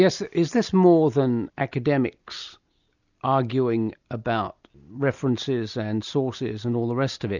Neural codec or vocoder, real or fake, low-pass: none; real; 7.2 kHz